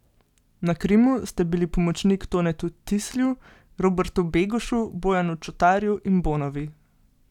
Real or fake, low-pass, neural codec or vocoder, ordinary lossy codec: real; 19.8 kHz; none; none